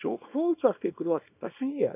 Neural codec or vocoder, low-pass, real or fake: codec, 24 kHz, 0.9 kbps, WavTokenizer, small release; 3.6 kHz; fake